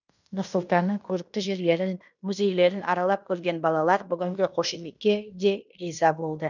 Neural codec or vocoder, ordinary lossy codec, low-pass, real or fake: codec, 16 kHz in and 24 kHz out, 0.9 kbps, LongCat-Audio-Codec, fine tuned four codebook decoder; none; 7.2 kHz; fake